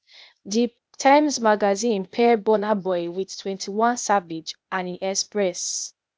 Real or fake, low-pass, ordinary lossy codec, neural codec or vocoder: fake; none; none; codec, 16 kHz, 0.8 kbps, ZipCodec